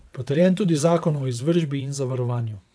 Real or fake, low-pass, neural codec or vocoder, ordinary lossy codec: fake; none; vocoder, 22.05 kHz, 80 mel bands, WaveNeXt; none